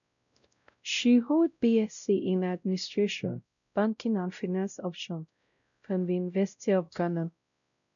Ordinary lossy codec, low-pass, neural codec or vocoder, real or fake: none; 7.2 kHz; codec, 16 kHz, 0.5 kbps, X-Codec, WavLM features, trained on Multilingual LibriSpeech; fake